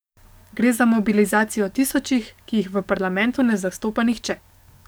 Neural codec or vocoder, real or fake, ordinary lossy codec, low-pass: codec, 44.1 kHz, 7.8 kbps, DAC; fake; none; none